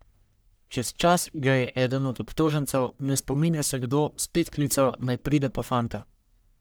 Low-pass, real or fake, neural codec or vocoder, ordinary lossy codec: none; fake; codec, 44.1 kHz, 1.7 kbps, Pupu-Codec; none